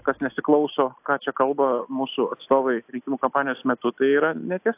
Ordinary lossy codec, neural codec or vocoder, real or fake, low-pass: AAC, 32 kbps; none; real; 3.6 kHz